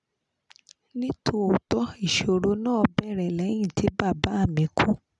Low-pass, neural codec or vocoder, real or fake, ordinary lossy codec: 10.8 kHz; none; real; none